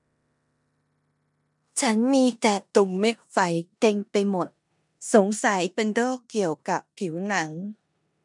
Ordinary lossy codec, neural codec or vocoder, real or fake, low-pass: none; codec, 16 kHz in and 24 kHz out, 0.9 kbps, LongCat-Audio-Codec, four codebook decoder; fake; 10.8 kHz